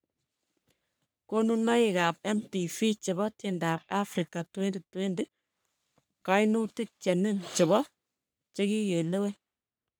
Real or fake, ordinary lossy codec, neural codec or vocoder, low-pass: fake; none; codec, 44.1 kHz, 3.4 kbps, Pupu-Codec; none